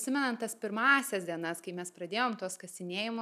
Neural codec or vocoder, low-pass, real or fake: none; 14.4 kHz; real